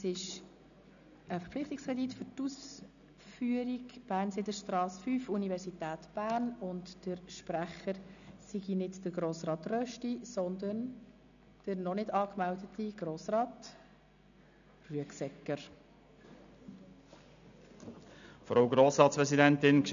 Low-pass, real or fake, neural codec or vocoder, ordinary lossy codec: 7.2 kHz; real; none; none